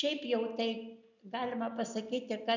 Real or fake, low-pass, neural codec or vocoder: real; 7.2 kHz; none